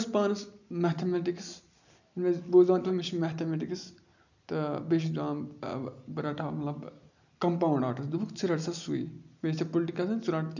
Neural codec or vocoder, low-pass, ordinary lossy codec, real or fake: none; 7.2 kHz; none; real